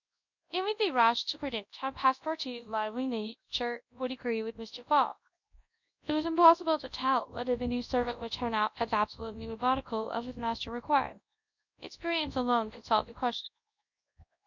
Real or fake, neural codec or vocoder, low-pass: fake; codec, 24 kHz, 0.9 kbps, WavTokenizer, large speech release; 7.2 kHz